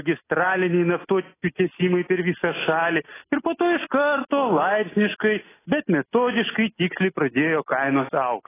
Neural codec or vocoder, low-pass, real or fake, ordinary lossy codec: none; 3.6 kHz; real; AAC, 16 kbps